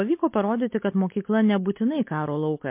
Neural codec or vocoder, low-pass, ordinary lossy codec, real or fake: none; 3.6 kHz; MP3, 24 kbps; real